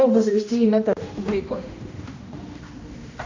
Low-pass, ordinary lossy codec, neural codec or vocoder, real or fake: 7.2 kHz; MP3, 64 kbps; codec, 16 kHz, 1 kbps, X-Codec, HuBERT features, trained on general audio; fake